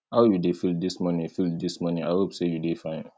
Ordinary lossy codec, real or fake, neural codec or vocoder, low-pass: none; real; none; none